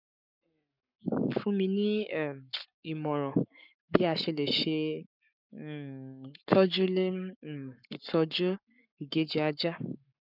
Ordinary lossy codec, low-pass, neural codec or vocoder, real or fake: none; 5.4 kHz; codec, 44.1 kHz, 7.8 kbps, Pupu-Codec; fake